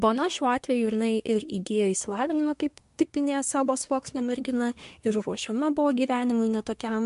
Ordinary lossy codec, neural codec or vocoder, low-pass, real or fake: MP3, 64 kbps; codec, 24 kHz, 1 kbps, SNAC; 10.8 kHz; fake